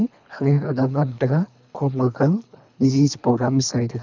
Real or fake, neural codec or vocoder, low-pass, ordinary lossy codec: fake; codec, 24 kHz, 3 kbps, HILCodec; 7.2 kHz; none